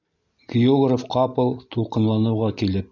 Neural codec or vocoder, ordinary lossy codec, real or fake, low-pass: none; MP3, 64 kbps; real; 7.2 kHz